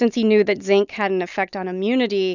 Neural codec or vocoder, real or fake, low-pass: none; real; 7.2 kHz